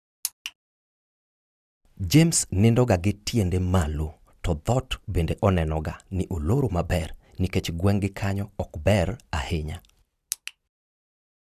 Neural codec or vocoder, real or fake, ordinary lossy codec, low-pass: none; real; none; 14.4 kHz